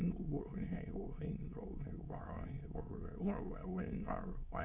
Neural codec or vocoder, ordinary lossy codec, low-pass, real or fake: autoencoder, 22.05 kHz, a latent of 192 numbers a frame, VITS, trained on many speakers; none; 3.6 kHz; fake